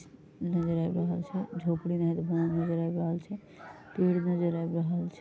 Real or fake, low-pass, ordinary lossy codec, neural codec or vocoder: real; none; none; none